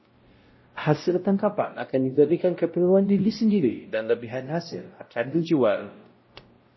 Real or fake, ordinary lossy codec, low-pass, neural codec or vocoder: fake; MP3, 24 kbps; 7.2 kHz; codec, 16 kHz, 0.5 kbps, X-Codec, WavLM features, trained on Multilingual LibriSpeech